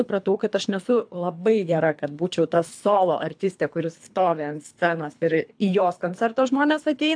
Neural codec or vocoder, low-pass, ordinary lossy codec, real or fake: codec, 24 kHz, 3 kbps, HILCodec; 9.9 kHz; AAC, 64 kbps; fake